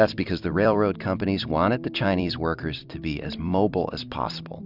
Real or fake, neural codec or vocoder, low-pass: fake; vocoder, 44.1 kHz, 128 mel bands every 256 samples, BigVGAN v2; 5.4 kHz